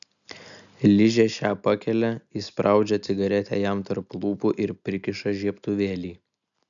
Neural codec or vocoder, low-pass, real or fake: none; 7.2 kHz; real